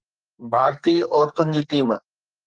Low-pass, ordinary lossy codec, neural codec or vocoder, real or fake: 9.9 kHz; Opus, 24 kbps; codec, 44.1 kHz, 2.6 kbps, SNAC; fake